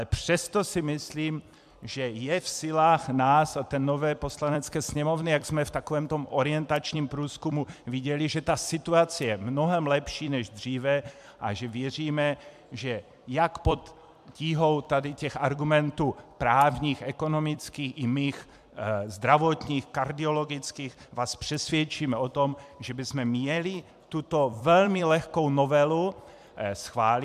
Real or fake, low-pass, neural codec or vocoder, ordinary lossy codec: fake; 14.4 kHz; vocoder, 44.1 kHz, 128 mel bands every 256 samples, BigVGAN v2; MP3, 96 kbps